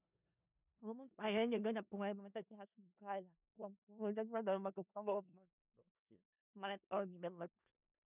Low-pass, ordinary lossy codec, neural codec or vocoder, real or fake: 3.6 kHz; none; codec, 16 kHz in and 24 kHz out, 0.4 kbps, LongCat-Audio-Codec, four codebook decoder; fake